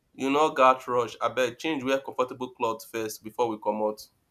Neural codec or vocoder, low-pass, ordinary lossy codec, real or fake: none; 14.4 kHz; none; real